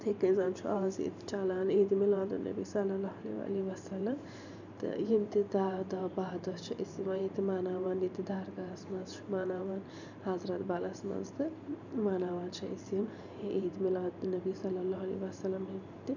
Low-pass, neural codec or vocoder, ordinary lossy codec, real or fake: 7.2 kHz; vocoder, 44.1 kHz, 128 mel bands every 512 samples, BigVGAN v2; none; fake